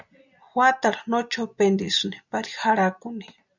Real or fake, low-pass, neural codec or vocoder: real; 7.2 kHz; none